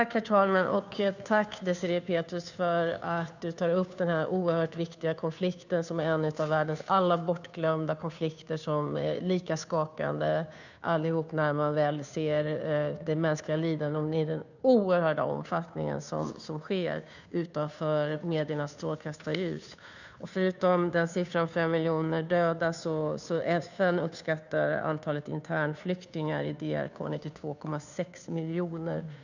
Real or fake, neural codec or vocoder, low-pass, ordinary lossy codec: fake; codec, 16 kHz, 2 kbps, FunCodec, trained on Chinese and English, 25 frames a second; 7.2 kHz; none